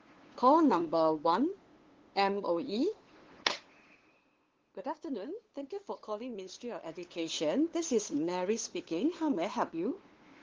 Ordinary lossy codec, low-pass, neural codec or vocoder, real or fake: Opus, 16 kbps; 7.2 kHz; codec, 16 kHz, 2 kbps, FunCodec, trained on LibriTTS, 25 frames a second; fake